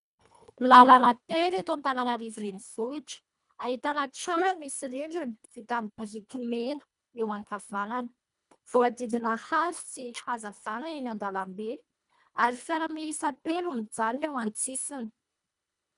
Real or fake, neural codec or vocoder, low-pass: fake; codec, 24 kHz, 1.5 kbps, HILCodec; 10.8 kHz